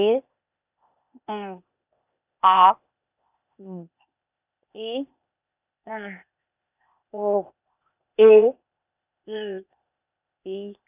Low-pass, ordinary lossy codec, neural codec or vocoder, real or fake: 3.6 kHz; none; codec, 16 kHz, 0.8 kbps, ZipCodec; fake